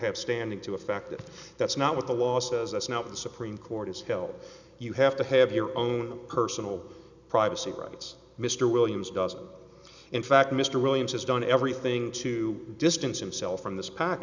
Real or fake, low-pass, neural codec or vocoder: real; 7.2 kHz; none